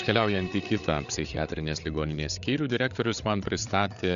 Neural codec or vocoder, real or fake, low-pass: codec, 16 kHz, 8 kbps, FreqCodec, larger model; fake; 7.2 kHz